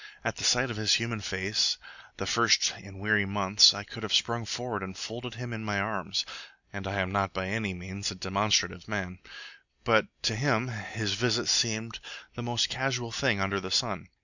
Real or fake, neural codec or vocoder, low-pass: real; none; 7.2 kHz